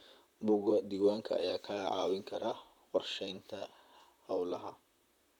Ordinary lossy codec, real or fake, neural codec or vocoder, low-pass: none; fake; vocoder, 44.1 kHz, 128 mel bands, Pupu-Vocoder; 19.8 kHz